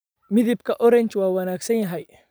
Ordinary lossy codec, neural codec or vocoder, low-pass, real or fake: none; vocoder, 44.1 kHz, 128 mel bands every 512 samples, BigVGAN v2; none; fake